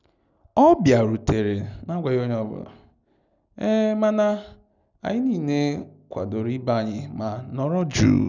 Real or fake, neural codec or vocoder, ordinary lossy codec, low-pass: real; none; none; 7.2 kHz